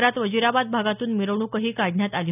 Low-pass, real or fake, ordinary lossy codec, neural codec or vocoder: 3.6 kHz; real; none; none